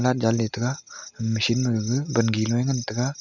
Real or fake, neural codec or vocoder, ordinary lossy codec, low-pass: real; none; none; 7.2 kHz